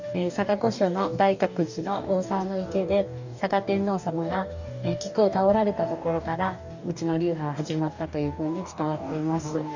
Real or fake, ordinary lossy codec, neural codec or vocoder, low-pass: fake; none; codec, 44.1 kHz, 2.6 kbps, DAC; 7.2 kHz